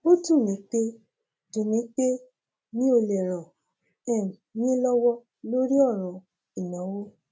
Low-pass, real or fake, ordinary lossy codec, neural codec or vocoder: none; real; none; none